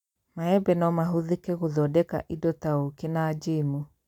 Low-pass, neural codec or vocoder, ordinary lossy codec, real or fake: 19.8 kHz; none; none; real